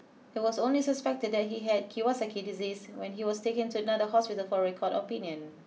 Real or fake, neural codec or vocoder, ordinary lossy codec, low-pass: real; none; none; none